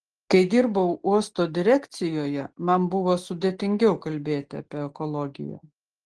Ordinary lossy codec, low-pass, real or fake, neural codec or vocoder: Opus, 16 kbps; 10.8 kHz; real; none